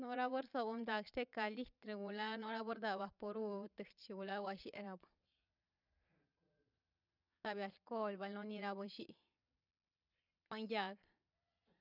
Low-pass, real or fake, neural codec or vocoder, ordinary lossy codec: 5.4 kHz; fake; vocoder, 22.05 kHz, 80 mel bands, Vocos; none